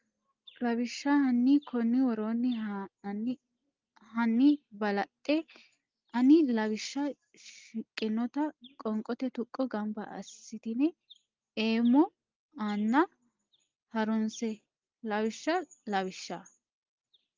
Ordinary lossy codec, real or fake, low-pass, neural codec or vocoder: Opus, 32 kbps; real; 7.2 kHz; none